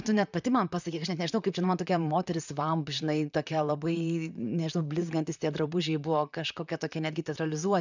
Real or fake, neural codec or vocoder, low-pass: fake; vocoder, 44.1 kHz, 128 mel bands, Pupu-Vocoder; 7.2 kHz